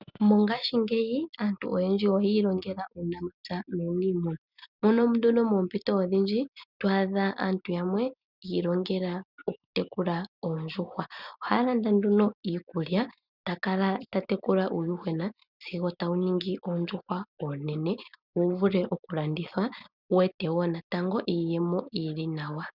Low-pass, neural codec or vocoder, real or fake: 5.4 kHz; none; real